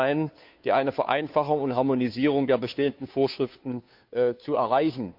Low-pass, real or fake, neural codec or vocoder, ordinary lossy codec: 5.4 kHz; fake; codec, 16 kHz, 2 kbps, FunCodec, trained on Chinese and English, 25 frames a second; none